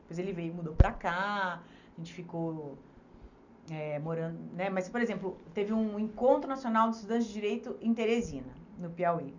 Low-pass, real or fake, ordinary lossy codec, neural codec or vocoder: 7.2 kHz; real; none; none